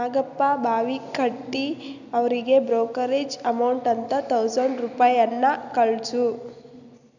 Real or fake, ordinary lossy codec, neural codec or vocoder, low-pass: real; none; none; 7.2 kHz